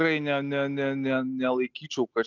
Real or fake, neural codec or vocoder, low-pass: real; none; 7.2 kHz